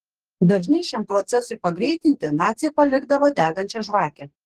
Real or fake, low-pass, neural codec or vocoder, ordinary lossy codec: fake; 14.4 kHz; codec, 44.1 kHz, 2.6 kbps, DAC; Opus, 16 kbps